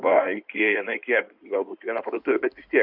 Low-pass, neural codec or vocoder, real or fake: 5.4 kHz; codec, 16 kHz in and 24 kHz out, 2.2 kbps, FireRedTTS-2 codec; fake